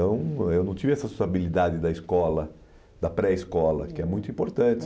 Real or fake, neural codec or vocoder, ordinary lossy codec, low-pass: real; none; none; none